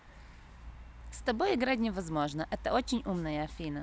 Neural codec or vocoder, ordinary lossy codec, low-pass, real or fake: none; none; none; real